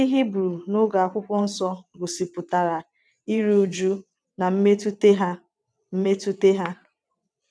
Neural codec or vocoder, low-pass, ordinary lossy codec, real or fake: vocoder, 22.05 kHz, 80 mel bands, WaveNeXt; none; none; fake